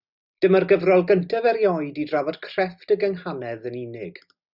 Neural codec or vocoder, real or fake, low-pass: none; real; 5.4 kHz